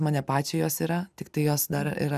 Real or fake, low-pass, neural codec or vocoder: real; 14.4 kHz; none